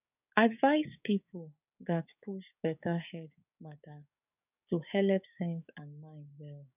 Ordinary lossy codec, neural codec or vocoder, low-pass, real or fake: none; codec, 16 kHz, 6 kbps, DAC; 3.6 kHz; fake